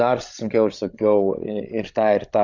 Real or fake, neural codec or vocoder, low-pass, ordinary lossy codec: fake; codec, 16 kHz, 16 kbps, FreqCodec, larger model; 7.2 kHz; Opus, 64 kbps